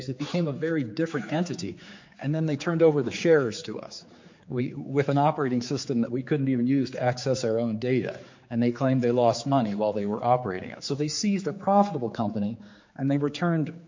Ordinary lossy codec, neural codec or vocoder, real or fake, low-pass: MP3, 48 kbps; codec, 16 kHz, 4 kbps, X-Codec, HuBERT features, trained on general audio; fake; 7.2 kHz